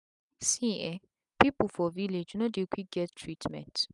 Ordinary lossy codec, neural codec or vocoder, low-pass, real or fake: none; none; 10.8 kHz; real